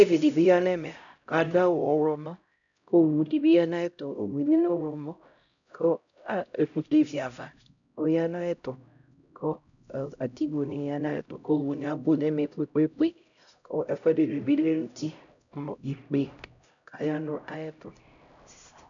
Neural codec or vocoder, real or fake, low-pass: codec, 16 kHz, 0.5 kbps, X-Codec, HuBERT features, trained on LibriSpeech; fake; 7.2 kHz